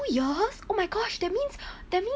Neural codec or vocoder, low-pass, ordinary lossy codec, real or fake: none; none; none; real